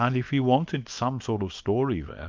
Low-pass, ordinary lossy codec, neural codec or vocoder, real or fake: 7.2 kHz; Opus, 24 kbps; codec, 24 kHz, 0.9 kbps, WavTokenizer, small release; fake